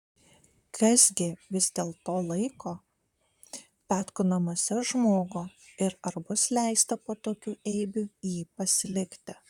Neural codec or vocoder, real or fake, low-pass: vocoder, 44.1 kHz, 128 mel bands, Pupu-Vocoder; fake; 19.8 kHz